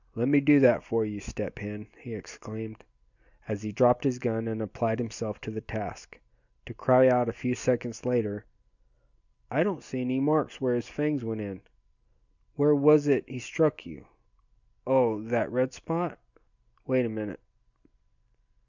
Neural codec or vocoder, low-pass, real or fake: none; 7.2 kHz; real